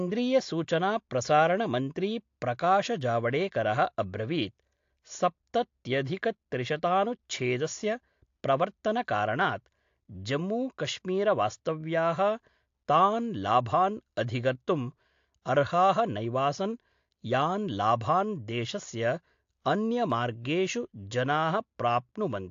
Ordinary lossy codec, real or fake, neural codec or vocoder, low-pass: AAC, 48 kbps; real; none; 7.2 kHz